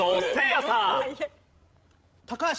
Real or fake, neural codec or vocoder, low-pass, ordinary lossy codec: fake; codec, 16 kHz, 16 kbps, FreqCodec, larger model; none; none